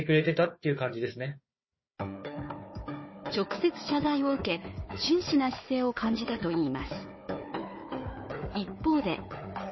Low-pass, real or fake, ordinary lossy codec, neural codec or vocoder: 7.2 kHz; fake; MP3, 24 kbps; codec, 16 kHz, 4 kbps, FunCodec, trained on Chinese and English, 50 frames a second